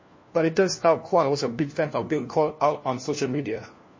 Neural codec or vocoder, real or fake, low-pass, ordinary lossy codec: codec, 16 kHz, 1 kbps, FunCodec, trained on LibriTTS, 50 frames a second; fake; 7.2 kHz; MP3, 32 kbps